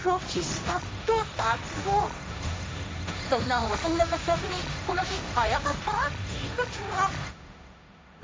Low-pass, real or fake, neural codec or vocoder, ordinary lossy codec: none; fake; codec, 16 kHz, 1.1 kbps, Voila-Tokenizer; none